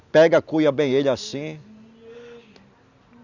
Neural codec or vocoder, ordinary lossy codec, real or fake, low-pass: none; none; real; 7.2 kHz